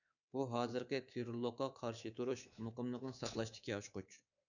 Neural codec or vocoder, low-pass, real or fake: codec, 24 kHz, 3.1 kbps, DualCodec; 7.2 kHz; fake